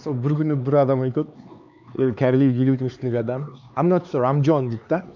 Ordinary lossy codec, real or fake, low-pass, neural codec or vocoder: none; fake; 7.2 kHz; codec, 16 kHz, 4 kbps, X-Codec, HuBERT features, trained on LibriSpeech